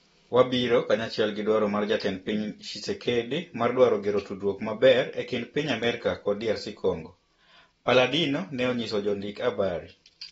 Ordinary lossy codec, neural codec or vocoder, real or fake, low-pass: AAC, 24 kbps; none; real; 19.8 kHz